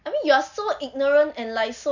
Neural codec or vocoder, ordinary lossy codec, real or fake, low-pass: none; none; real; 7.2 kHz